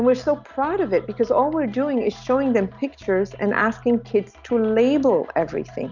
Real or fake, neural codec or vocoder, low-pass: real; none; 7.2 kHz